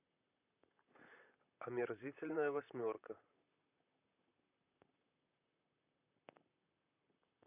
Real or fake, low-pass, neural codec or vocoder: fake; 3.6 kHz; vocoder, 44.1 kHz, 128 mel bands, Pupu-Vocoder